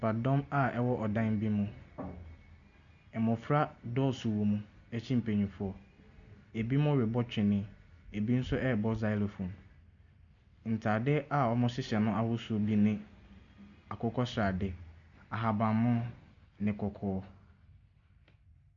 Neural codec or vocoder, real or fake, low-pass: none; real; 7.2 kHz